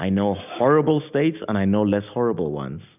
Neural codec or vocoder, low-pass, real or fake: none; 3.6 kHz; real